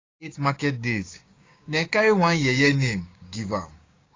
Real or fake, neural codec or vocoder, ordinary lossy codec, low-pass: fake; codec, 16 kHz, 6 kbps, DAC; AAC, 32 kbps; 7.2 kHz